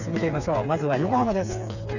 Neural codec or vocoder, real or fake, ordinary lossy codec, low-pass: codec, 16 kHz, 4 kbps, FreqCodec, smaller model; fake; none; 7.2 kHz